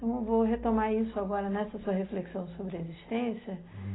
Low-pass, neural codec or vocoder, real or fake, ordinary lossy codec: 7.2 kHz; none; real; AAC, 16 kbps